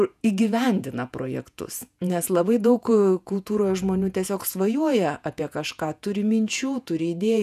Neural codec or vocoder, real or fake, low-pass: vocoder, 48 kHz, 128 mel bands, Vocos; fake; 14.4 kHz